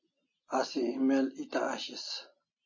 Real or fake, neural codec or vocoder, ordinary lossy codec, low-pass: real; none; MP3, 32 kbps; 7.2 kHz